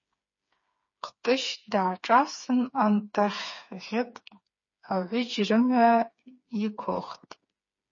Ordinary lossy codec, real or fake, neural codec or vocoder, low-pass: MP3, 32 kbps; fake; codec, 16 kHz, 4 kbps, FreqCodec, smaller model; 7.2 kHz